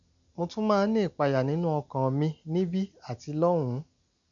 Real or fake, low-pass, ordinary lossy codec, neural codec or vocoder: real; 7.2 kHz; none; none